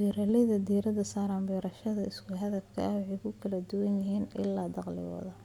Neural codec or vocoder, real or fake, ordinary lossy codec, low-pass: none; real; none; 19.8 kHz